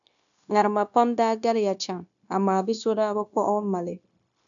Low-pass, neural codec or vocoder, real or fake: 7.2 kHz; codec, 16 kHz, 0.9 kbps, LongCat-Audio-Codec; fake